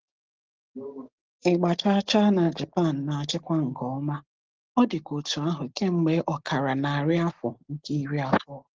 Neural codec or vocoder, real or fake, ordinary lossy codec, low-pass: none; real; Opus, 16 kbps; 7.2 kHz